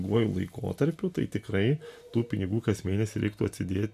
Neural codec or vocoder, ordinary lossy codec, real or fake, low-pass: none; MP3, 96 kbps; real; 14.4 kHz